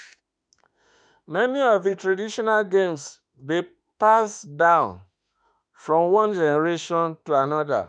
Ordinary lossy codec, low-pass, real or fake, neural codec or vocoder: none; 9.9 kHz; fake; autoencoder, 48 kHz, 32 numbers a frame, DAC-VAE, trained on Japanese speech